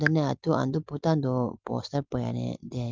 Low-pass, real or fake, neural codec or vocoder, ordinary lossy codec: 7.2 kHz; real; none; Opus, 24 kbps